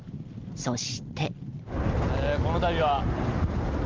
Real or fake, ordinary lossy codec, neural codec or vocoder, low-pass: real; Opus, 32 kbps; none; 7.2 kHz